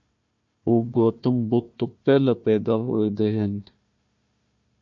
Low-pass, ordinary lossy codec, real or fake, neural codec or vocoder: 7.2 kHz; MP3, 48 kbps; fake; codec, 16 kHz, 1 kbps, FunCodec, trained on Chinese and English, 50 frames a second